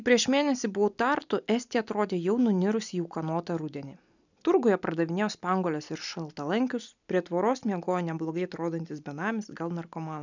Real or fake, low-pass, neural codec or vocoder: real; 7.2 kHz; none